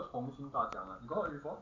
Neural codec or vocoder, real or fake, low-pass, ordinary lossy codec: none; real; 7.2 kHz; none